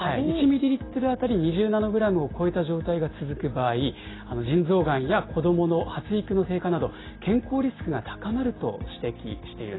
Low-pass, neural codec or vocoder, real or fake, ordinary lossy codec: 7.2 kHz; none; real; AAC, 16 kbps